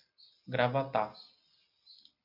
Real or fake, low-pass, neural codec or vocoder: real; 5.4 kHz; none